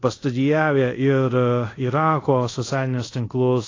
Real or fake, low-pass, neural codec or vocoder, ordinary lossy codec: fake; 7.2 kHz; codec, 24 kHz, 0.5 kbps, DualCodec; AAC, 32 kbps